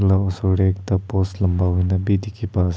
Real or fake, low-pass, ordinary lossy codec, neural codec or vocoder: real; none; none; none